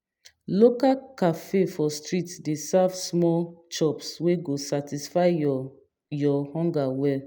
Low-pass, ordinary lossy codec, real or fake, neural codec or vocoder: none; none; real; none